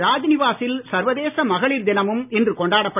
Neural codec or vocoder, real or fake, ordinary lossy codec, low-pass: none; real; none; 3.6 kHz